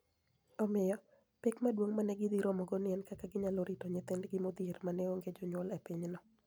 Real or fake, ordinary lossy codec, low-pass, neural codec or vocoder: real; none; none; none